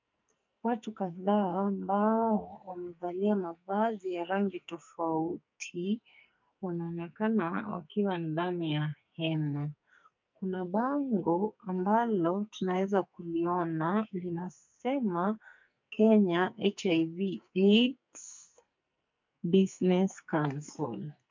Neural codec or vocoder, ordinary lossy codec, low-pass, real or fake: codec, 44.1 kHz, 2.6 kbps, SNAC; MP3, 64 kbps; 7.2 kHz; fake